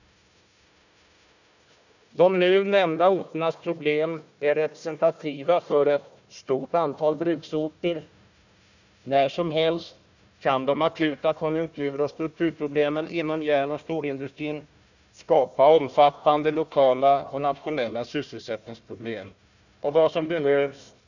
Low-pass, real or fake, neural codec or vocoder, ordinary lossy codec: 7.2 kHz; fake; codec, 16 kHz, 1 kbps, FunCodec, trained on Chinese and English, 50 frames a second; none